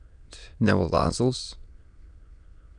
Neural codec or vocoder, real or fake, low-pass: autoencoder, 22.05 kHz, a latent of 192 numbers a frame, VITS, trained on many speakers; fake; 9.9 kHz